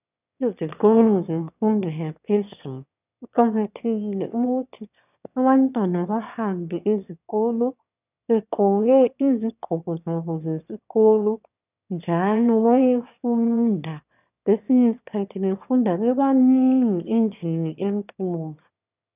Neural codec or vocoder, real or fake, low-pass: autoencoder, 22.05 kHz, a latent of 192 numbers a frame, VITS, trained on one speaker; fake; 3.6 kHz